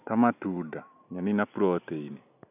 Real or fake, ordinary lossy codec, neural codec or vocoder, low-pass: real; none; none; 3.6 kHz